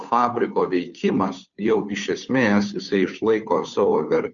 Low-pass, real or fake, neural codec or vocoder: 7.2 kHz; fake; codec, 16 kHz, 2 kbps, FunCodec, trained on Chinese and English, 25 frames a second